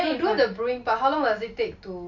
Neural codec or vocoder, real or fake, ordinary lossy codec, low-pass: none; real; MP3, 48 kbps; 7.2 kHz